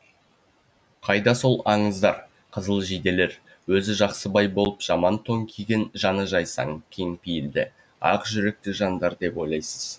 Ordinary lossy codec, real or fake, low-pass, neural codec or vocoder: none; real; none; none